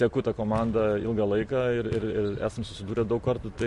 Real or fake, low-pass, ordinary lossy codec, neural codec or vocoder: real; 14.4 kHz; MP3, 48 kbps; none